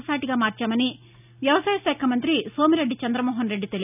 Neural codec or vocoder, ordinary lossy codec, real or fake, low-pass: none; none; real; 3.6 kHz